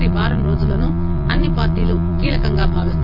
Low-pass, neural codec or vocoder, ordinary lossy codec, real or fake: 5.4 kHz; vocoder, 22.05 kHz, 80 mel bands, Vocos; none; fake